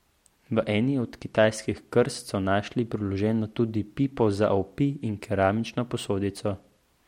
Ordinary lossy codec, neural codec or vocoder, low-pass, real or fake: MP3, 64 kbps; none; 19.8 kHz; real